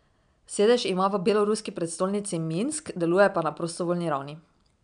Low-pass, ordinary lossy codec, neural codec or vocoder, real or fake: 9.9 kHz; none; none; real